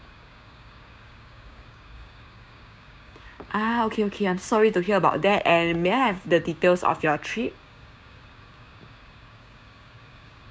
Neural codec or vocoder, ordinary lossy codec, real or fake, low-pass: codec, 16 kHz, 6 kbps, DAC; none; fake; none